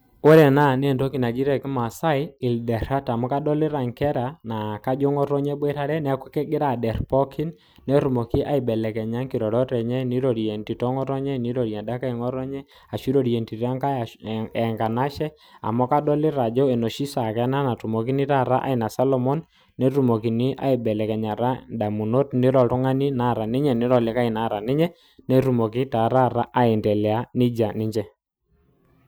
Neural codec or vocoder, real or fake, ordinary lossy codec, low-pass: none; real; none; none